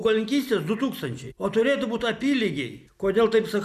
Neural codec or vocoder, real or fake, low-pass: none; real; 14.4 kHz